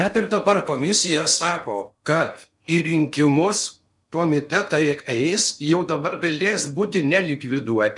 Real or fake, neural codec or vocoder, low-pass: fake; codec, 16 kHz in and 24 kHz out, 0.6 kbps, FocalCodec, streaming, 4096 codes; 10.8 kHz